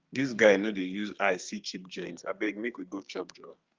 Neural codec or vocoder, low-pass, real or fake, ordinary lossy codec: codec, 32 kHz, 1.9 kbps, SNAC; 7.2 kHz; fake; Opus, 32 kbps